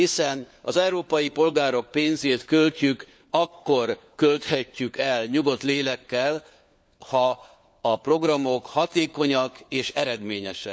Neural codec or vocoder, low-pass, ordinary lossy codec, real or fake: codec, 16 kHz, 4 kbps, FunCodec, trained on LibriTTS, 50 frames a second; none; none; fake